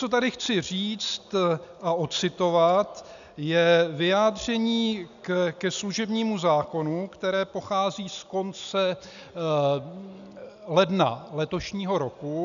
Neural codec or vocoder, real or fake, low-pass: none; real; 7.2 kHz